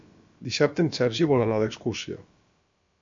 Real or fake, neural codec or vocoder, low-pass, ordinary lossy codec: fake; codec, 16 kHz, about 1 kbps, DyCAST, with the encoder's durations; 7.2 kHz; MP3, 48 kbps